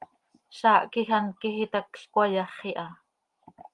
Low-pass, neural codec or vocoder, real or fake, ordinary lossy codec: 10.8 kHz; none; real; Opus, 24 kbps